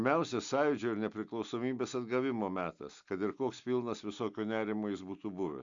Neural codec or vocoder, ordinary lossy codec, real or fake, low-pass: none; Opus, 64 kbps; real; 7.2 kHz